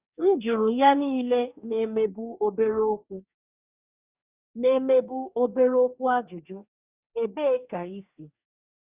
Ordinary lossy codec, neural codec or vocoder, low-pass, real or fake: Opus, 64 kbps; codec, 44.1 kHz, 2.6 kbps, DAC; 3.6 kHz; fake